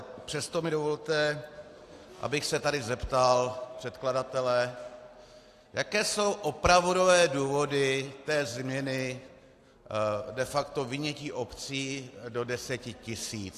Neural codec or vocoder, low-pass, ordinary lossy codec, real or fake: none; 14.4 kHz; AAC, 64 kbps; real